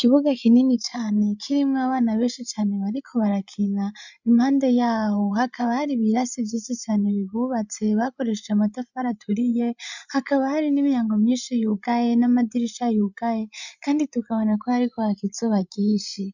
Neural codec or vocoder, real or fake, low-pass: codec, 16 kHz, 16 kbps, FreqCodec, larger model; fake; 7.2 kHz